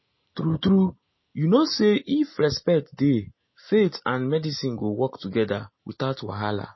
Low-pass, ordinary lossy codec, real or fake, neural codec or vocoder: 7.2 kHz; MP3, 24 kbps; real; none